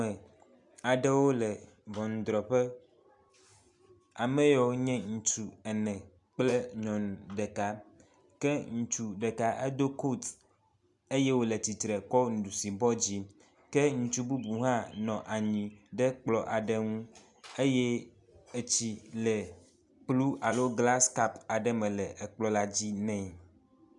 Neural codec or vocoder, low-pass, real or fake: none; 10.8 kHz; real